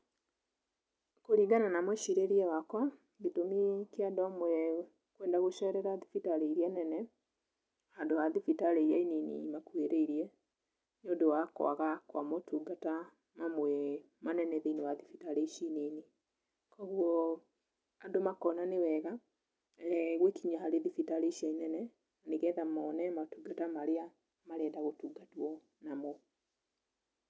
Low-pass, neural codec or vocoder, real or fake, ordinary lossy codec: none; none; real; none